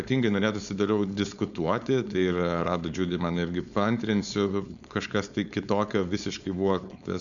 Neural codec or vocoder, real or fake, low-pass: codec, 16 kHz, 4.8 kbps, FACodec; fake; 7.2 kHz